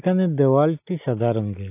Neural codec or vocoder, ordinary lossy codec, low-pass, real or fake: none; AAC, 32 kbps; 3.6 kHz; real